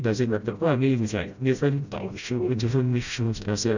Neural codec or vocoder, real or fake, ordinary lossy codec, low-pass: codec, 16 kHz, 0.5 kbps, FreqCodec, smaller model; fake; none; 7.2 kHz